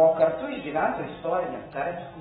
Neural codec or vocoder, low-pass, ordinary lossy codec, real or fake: none; 7.2 kHz; AAC, 16 kbps; real